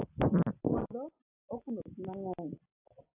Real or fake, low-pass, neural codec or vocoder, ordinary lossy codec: real; 3.6 kHz; none; AAC, 32 kbps